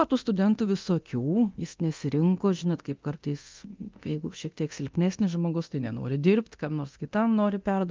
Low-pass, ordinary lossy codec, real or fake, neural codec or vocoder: 7.2 kHz; Opus, 32 kbps; fake; codec, 24 kHz, 0.9 kbps, DualCodec